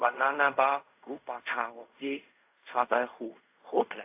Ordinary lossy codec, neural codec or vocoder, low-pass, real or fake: AAC, 32 kbps; codec, 16 kHz in and 24 kHz out, 0.4 kbps, LongCat-Audio-Codec, fine tuned four codebook decoder; 3.6 kHz; fake